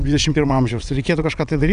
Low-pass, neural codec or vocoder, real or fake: 9.9 kHz; vocoder, 22.05 kHz, 80 mel bands, WaveNeXt; fake